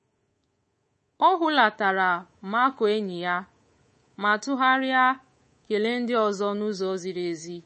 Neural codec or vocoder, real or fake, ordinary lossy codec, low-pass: codec, 24 kHz, 3.1 kbps, DualCodec; fake; MP3, 32 kbps; 10.8 kHz